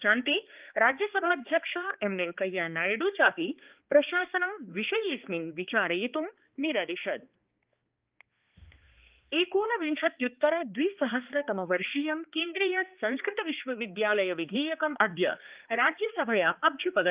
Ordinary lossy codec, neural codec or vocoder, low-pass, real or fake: Opus, 24 kbps; codec, 16 kHz, 2 kbps, X-Codec, HuBERT features, trained on balanced general audio; 3.6 kHz; fake